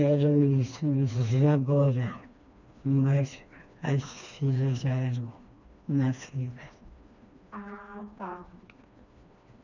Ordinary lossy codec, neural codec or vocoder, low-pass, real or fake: none; codec, 16 kHz, 2 kbps, FreqCodec, smaller model; 7.2 kHz; fake